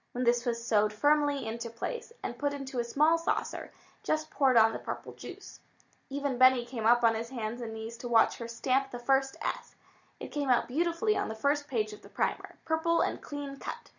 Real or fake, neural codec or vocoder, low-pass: real; none; 7.2 kHz